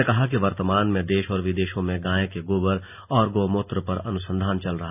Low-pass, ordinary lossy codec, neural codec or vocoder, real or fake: 3.6 kHz; none; none; real